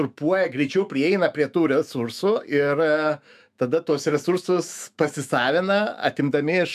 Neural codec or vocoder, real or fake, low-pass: autoencoder, 48 kHz, 128 numbers a frame, DAC-VAE, trained on Japanese speech; fake; 14.4 kHz